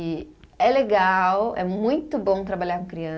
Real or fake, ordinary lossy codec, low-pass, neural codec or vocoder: real; none; none; none